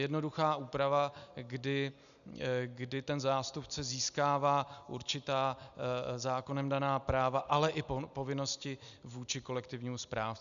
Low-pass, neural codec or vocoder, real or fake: 7.2 kHz; none; real